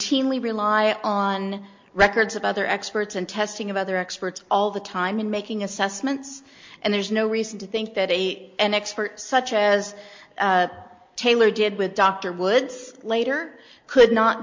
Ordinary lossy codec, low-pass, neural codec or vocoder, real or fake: MP3, 48 kbps; 7.2 kHz; none; real